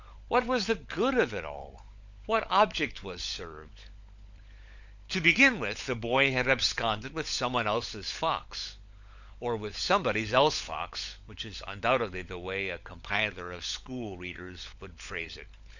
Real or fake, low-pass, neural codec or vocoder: fake; 7.2 kHz; codec, 16 kHz, 16 kbps, FunCodec, trained on LibriTTS, 50 frames a second